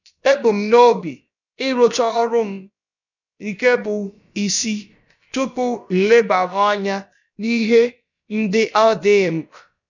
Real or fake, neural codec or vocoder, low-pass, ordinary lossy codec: fake; codec, 16 kHz, about 1 kbps, DyCAST, with the encoder's durations; 7.2 kHz; none